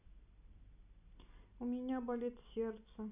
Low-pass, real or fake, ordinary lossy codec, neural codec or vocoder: 3.6 kHz; real; none; none